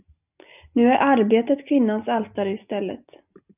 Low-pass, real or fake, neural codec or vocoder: 3.6 kHz; real; none